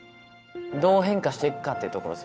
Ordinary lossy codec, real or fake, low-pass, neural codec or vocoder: none; fake; none; codec, 16 kHz, 8 kbps, FunCodec, trained on Chinese and English, 25 frames a second